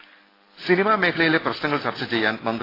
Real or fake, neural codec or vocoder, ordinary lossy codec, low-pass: real; none; AAC, 24 kbps; 5.4 kHz